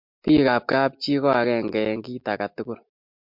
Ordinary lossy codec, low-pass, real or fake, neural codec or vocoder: MP3, 48 kbps; 5.4 kHz; real; none